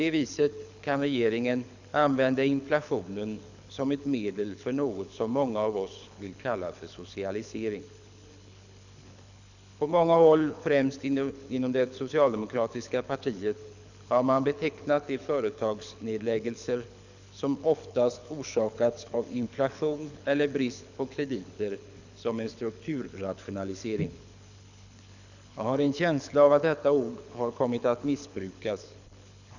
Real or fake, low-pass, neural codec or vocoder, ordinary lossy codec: fake; 7.2 kHz; codec, 24 kHz, 6 kbps, HILCodec; none